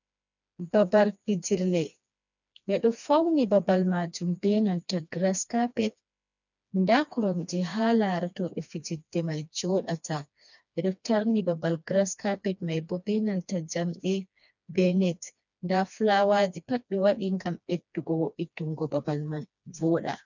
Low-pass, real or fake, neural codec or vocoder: 7.2 kHz; fake; codec, 16 kHz, 2 kbps, FreqCodec, smaller model